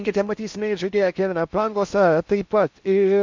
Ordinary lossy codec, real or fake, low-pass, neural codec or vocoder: MP3, 64 kbps; fake; 7.2 kHz; codec, 16 kHz in and 24 kHz out, 0.8 kbps, FocalCodec, streaming, 65536 codes